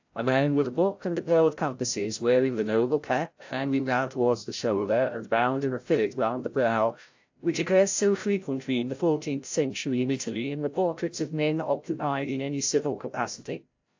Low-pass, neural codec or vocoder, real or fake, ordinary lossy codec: 7.2 kHz; codec, 16 kHz, 0.5 kbps, FreqCodec, larger model; fake; AAC, 48 kbps